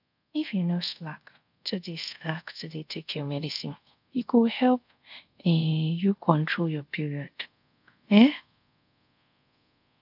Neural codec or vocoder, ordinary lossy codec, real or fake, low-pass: codec, 24 kHz, 0.5 kbps, DualCodec; none; fake; 5.4 kHz